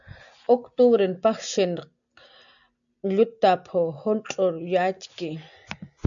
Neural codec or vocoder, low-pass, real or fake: none; 7.2 kHz; real